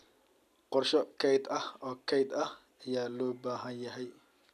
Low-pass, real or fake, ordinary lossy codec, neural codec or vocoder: 14.4 kHz; real; none; none